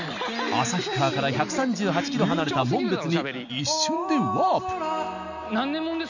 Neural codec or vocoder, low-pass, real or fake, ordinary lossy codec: none; 7.2 kHz; real; none